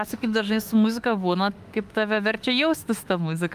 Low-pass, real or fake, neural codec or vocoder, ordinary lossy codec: 14.4 kHz; fake; autoencoder, 48 kHz, 32 numbers a frame, DAC-VAE, trained on Japanese speech; Opus, 32 kbps